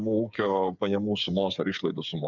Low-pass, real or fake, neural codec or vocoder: 7.2 kHz; fake; codec, 16 kHz, 8 kbps, FreqCodec, smaller model